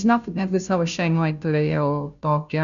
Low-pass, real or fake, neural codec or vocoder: 7.2 kHz; fake; codec, 16 kHz, 0.5 kbps, FunCodec, trained on Chinese and English, 25 frames a second